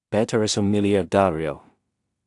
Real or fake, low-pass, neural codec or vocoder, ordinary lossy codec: fake; 10.8 kHz; codec, 16 kHz in and 24 kHz out, 0.4 kbps, LongCat-Audio-Codec, two codebook decoder; AAC, 64 kbps